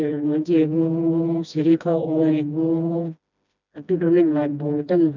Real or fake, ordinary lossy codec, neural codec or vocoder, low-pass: fake; none; codec, 16 kHz, 1 kbps, FreqCodec, smaller model; 7.2 kHz